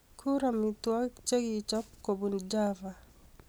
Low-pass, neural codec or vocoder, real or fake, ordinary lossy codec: none; none; real; none